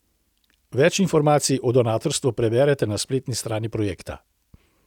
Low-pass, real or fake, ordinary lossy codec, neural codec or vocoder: 19.8 kHz; real; none; none